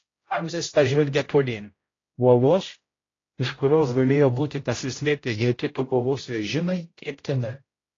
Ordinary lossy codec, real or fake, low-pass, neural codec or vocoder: AAC, 32 kbps; fake; 7.2 kHz; codec, 16 kHz, 0.5 kbps, X-Codec, HuBERT features, trained on general audio